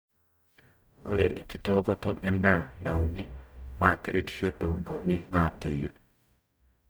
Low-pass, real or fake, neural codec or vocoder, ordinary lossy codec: none; fake; codec, 44.1 kHz, 0.9 kbps, DAC; none